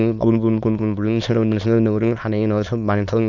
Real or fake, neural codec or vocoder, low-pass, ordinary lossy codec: fake; autoencoder, 22.05 kHz, a latent of 192 numbers a frame, VITS, trained on many speakers; 7.2 kHz; none